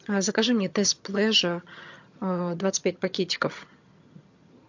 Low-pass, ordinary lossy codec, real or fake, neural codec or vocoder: 7.2 kHz; MP3, 48 kbps; fake; vocoder, 22.05 kHz, 80 mel bands, HiFi-GAN